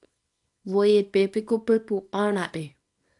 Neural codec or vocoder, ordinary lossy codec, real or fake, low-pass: codec, 24 kHz, 0.9 kbps, WavTokenizer, small release; AAC, 64 kbps; fake; 10.8 kHz